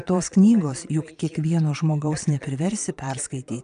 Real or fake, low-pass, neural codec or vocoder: fake; 9.9 kHz; vocoder, 22.05 kHz, 80 mel bands, Vocos